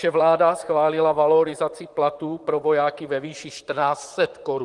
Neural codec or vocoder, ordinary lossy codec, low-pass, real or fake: vocoder, 44.1 kHz, 128 mel bands, Pupu-Vocoder; Opus, 24 kbps; 10.8 kHz; fake